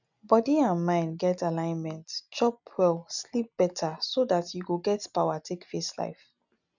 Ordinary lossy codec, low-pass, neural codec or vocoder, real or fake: none; 7.2 kHz; none; real